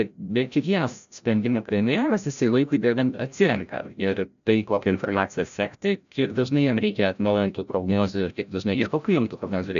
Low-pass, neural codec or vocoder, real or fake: 7.2 kHz; codec, 16 kHz, 0.5 kbps, FreqCodec, larger model; fake